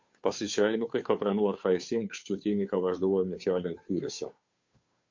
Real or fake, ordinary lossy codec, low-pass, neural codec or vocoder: fake; MP3, 48 kbps; 7.2 kHz; codec, 16 kHz, 2 kbps, FunCodec, trained on Chinese and English, 25 frames a second